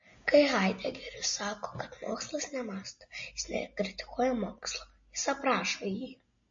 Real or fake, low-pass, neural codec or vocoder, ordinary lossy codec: real; 7.2 kHz; none; MP3, 32 kbps